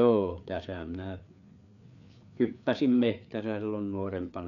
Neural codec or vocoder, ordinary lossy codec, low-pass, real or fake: codec, 16 kHz, 4 kbps, FreqCodec, larger model; MP3, 96 kbps; 7.2 kHz; fake